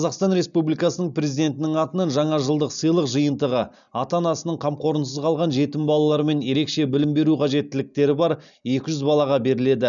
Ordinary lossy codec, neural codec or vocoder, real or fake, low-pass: none; none; real; 7.2 kHz